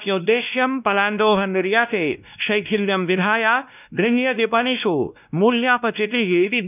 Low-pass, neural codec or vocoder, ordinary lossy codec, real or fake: 3.6 kHz; codec, 16 kHz, 1 kbps, X-Codec, WavLM features, trained on Multilingual LibriSpeech; none; fake